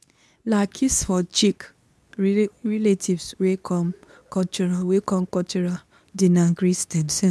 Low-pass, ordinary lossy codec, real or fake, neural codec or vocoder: none; none; fake; codec, 24 kHz, 0.9 kbps, WavTokenizer, medium speech release version 2